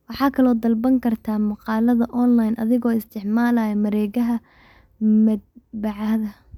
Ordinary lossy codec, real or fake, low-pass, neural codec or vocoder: none; real; 19.8 kHz; none